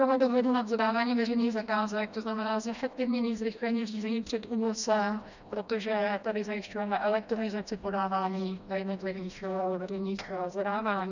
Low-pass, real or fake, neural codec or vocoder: 7.2 kHz; fake; codec, 16 kHz, 1 kbps, FreqCodec, smaller model